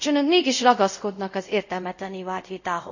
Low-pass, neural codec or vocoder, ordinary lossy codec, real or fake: 7.2 kHz; codec, 24 kHz, 0.5 kbps, DualCodec; none; fake